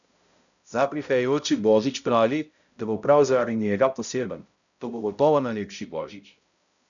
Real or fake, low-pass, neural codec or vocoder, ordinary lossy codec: fake; 7.2 kHz; codec, 16 kHz, 0.5 kbps, X-Codec, HuBERT features, trained on balanced general audio; none